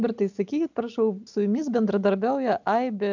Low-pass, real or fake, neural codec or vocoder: 7.2 kHz; real; none